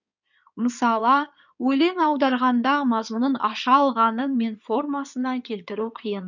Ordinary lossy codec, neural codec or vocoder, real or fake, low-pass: none; codec, 16 kHz in and 24 kHz out, 2.2 kbps, FireRedTTS-2 codec; fake; 7.2 kHz